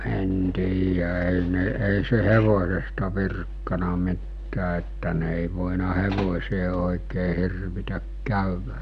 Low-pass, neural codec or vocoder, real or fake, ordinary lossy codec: 10.8 kHz; none; real; none